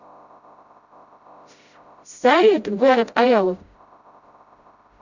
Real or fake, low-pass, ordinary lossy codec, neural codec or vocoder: fake; 7.2 kHz; Opus, 64 kbps; codec, 16 kHz, 0.5 kbps, FreqCodec, smaller model